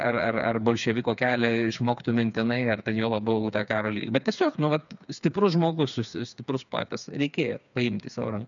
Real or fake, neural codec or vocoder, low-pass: fake; codec, 16 kHz, 4 kbps, FreqCodec, smaller model; 7.2 kHz